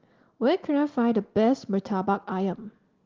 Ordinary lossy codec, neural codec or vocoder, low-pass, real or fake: Opus, 16 kbps; none; 7.2 kHz; real